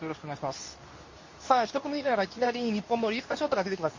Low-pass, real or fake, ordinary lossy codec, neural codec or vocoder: 7.2 kHz; fake; MP3, 32 kbps; codec, 24 kHz, 0.9 kbps, WavTokenizer, medium speech release version 2